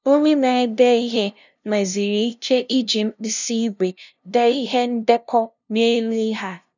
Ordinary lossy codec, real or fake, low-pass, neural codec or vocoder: none; fake; 7.2 kHz; codec, 16 kHz, 0.5 kbps, FunCodec, trained on LibriTTS, 25 frames a second